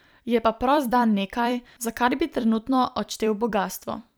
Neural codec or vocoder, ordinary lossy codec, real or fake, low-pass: vocoder, 44.1 kHz, 128 mel bands every 256 samples, BigVGAN v2; none; fake; none